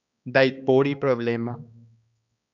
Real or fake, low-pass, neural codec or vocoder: fake; 7.2 kHz; codec, 16 kHz, 2 kbps, X-Codec, HuBERT features, trained on balanced general audio